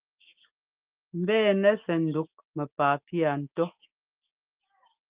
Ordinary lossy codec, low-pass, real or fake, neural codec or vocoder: Opus, 32 kbps; 3.6 kHz; fake; autoencoder, 48 kHz, 128 numbers a frame, DAC-VAE, trained on Japanese speech